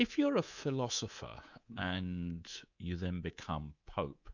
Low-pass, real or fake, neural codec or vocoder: 7.2 kHz; fake; codec, 24 kHz, 3.1 kbps, DualCodec